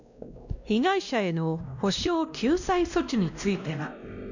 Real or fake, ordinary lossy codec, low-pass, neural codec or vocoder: fake; none; 7.2 kHz; codec, 16 kHz, 1 kbps, X-Codec, WavLM features, trained on Multilingual LibriSpeech